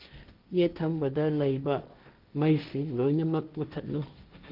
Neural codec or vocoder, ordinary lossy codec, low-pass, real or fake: codec, 16 kHz, 1.1 kbps, Voila-Tokenizer; Opus, 32 kbps; 5.4 kHz; fake